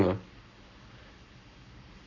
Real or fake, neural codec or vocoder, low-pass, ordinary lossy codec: fake; codec, 44.1 kHz, 7.8 kbps, Pupu-Codec; 7.2 kHz; none